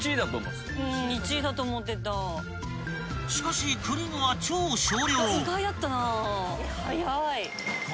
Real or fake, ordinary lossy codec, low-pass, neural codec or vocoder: real; none; none; none